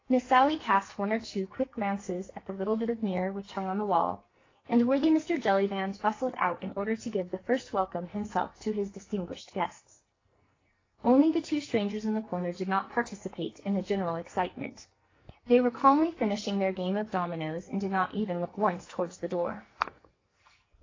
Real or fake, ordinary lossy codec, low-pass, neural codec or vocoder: fake; AAC, 32 kbps; 7.2 kHz; codec, 44.1 kHz, 2.6 kbps, SNAC